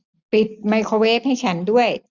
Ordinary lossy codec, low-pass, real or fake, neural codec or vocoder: none; 7.2 kHz; real; none